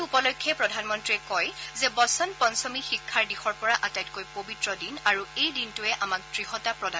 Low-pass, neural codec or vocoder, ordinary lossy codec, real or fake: none; none; none; real